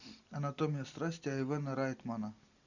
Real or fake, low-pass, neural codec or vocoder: real; 7.2 kHz; none